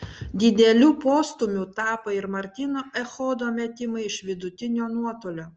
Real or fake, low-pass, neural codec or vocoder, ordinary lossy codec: real; 7.2 kHz; none; Opus, 24 kbps